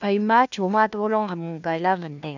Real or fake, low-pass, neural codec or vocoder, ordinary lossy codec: fake; 7.2 kHz; codec, 16 kHz, 1 kbps, FunCodec, trained on LibriTTS, 50 frames a second; none